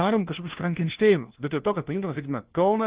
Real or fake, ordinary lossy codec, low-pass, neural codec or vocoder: fake; Opus, 16 kbps; 3.6 kHz; codec, 16 kHz, 1 kbps, FunCodec, trained on LibriTTS, 50 frames a second